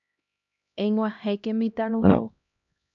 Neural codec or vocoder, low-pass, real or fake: codec, 16 kHz, 1 kbps, X-Codec, HuBERT features, trained on LibriSpeech; 7.2 kHz; fake